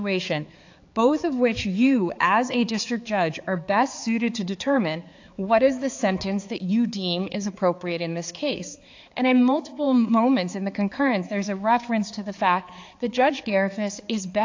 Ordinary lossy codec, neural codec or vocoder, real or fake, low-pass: AAC, 48 kbps; codec, 16 kHz, 4 kbps, X-Codec, HuBERT features, trained on balanced general audio; fake; 7.2 kHz